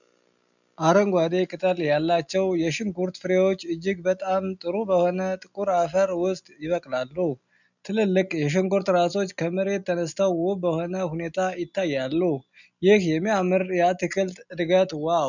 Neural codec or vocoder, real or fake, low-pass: none; real; 7.2 kHz